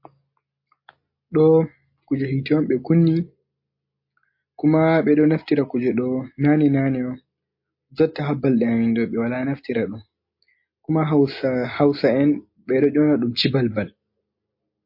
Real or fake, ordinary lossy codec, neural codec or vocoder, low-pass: real; MP3, 32 kbps; none; 5.4 kHz